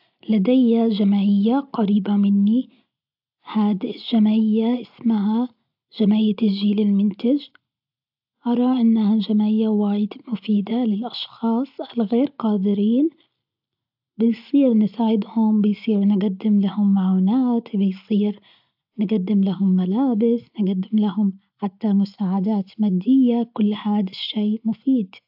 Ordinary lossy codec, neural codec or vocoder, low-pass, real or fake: AAC, 48 kbps; none; 5.4 kHz; real